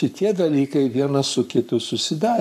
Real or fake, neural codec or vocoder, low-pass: fake; codec, 44.1 kHz, 7.8 kbps, Pupu-Codec; 14.4 kHz